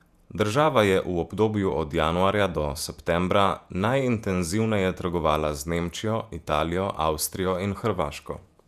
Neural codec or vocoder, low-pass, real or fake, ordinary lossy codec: vocoder, 48 kHz, 128 mel bands, Vocos; 14.4 kHz; fake; none